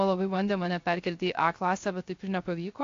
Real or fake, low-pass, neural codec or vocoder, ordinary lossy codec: fake; 7.2 kHz; codec, 16 kHz, 0.3 kbps, FocalCodec; AAC, 48 kbps